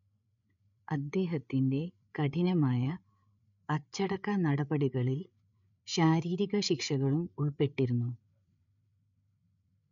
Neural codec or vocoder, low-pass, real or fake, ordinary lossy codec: codec, 16 kHz, 8 kbps, FreqCodec, larger model; 7.2 kHz; fake; none